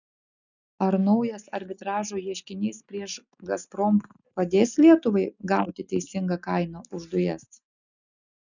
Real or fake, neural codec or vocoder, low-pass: real; none; 7.2 kHz